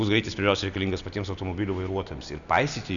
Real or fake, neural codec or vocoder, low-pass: real; none; 7.2 kHz